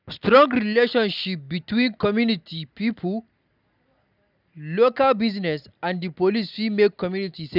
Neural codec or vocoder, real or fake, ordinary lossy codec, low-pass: none; real; none; 5.4 kHz